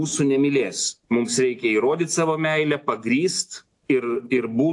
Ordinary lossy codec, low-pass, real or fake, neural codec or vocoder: AAC, 64 kbps; 10.8 kHz; real; none